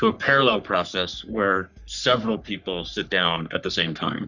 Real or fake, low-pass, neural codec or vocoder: fake; 7.2 kHz; codec, 44.1 kHz, 3.4 kbps, Pupu-Codec